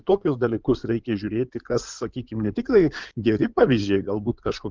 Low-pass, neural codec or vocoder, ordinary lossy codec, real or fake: 7.2 kHz; codec, 16 kHz in and 24 kHz out, 2.2 kbps, FireRedTTS-2 codec; Opus, 24 kbps; fake